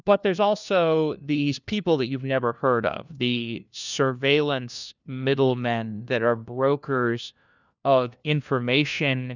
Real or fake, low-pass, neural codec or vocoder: fake; 7.2 kHz; codec, 16 kHz, 1 kbps, FunCodec, trained on LibriTTS, 50 frames a second